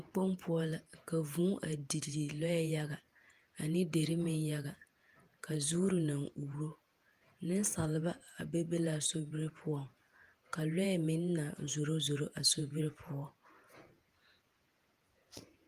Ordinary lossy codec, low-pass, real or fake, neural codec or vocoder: Opus, 32 kbps; 14.4 kHz; fake; vocoder, 48 kHz, 128 mel bands, Vocos